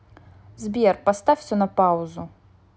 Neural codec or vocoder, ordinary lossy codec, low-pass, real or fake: none; none; none; real